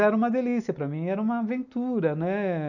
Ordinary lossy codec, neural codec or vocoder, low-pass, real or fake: none; none; 7.2 kHz; real